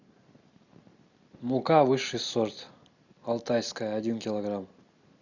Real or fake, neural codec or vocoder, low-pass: real; none; 7.2 kHz